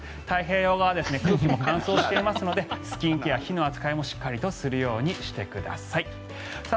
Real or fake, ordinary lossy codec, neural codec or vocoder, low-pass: real; none; none; none